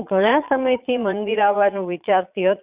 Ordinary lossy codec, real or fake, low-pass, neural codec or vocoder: Opus, 64 kbps; fake; 3.6 kHz; vocoder, 22.05 kHz, 80 mel bands, Vocos